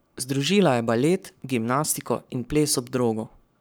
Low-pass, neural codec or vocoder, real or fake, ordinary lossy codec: none; codec, 44.1 kHz, 7.8 kbps, Pupu-Codec; fake; none